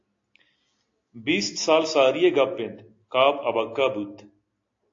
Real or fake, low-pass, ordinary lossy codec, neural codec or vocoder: real; 7.2 kHz; AAC, 48 kbps; none